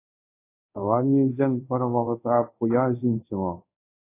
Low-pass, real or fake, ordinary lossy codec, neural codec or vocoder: 3.6 kHz; fake; AAC, 24 kbps; codec, 24 kHz, 0.5 kbps, DualCodec